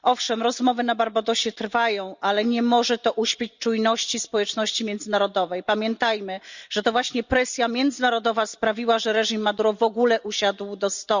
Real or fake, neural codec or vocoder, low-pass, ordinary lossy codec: real; none; 7.2 kHz; Opus, 64 kbps